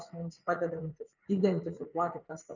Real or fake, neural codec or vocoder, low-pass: fake; vocoder, 44.1 kHz, 80 mel bands, Vocos; 7.2 kHz